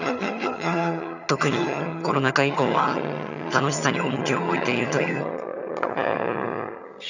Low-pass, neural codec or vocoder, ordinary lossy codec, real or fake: 7.2 kHz; vocoder, 22.05 kHz, 80 mel bands, HiFi-GAN; none; fake